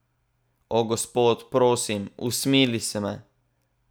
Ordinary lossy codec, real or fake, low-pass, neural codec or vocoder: none; real; none; none